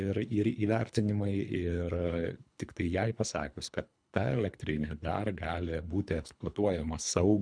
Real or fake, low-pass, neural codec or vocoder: fake; 9.9 kHz; codec, 24 kHz, 3 kbps, HILCodec